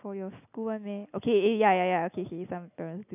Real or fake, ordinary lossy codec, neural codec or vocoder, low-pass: real; none; none; 3.6 kHz